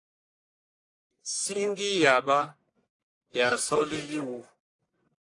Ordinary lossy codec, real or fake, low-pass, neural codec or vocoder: AAC, 48 kbps; fake; 10.8 kHz; codec, 44.1 kHz, 1.7 kbps, Pupu-Codec